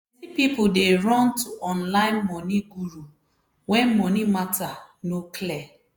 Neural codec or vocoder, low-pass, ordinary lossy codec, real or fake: vocoder, 48 kHz, 128 mel bands, Vocos; none; none; fake